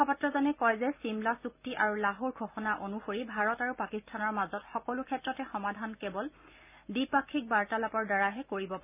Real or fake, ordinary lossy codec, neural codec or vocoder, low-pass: real; none; none; 3.6 kHz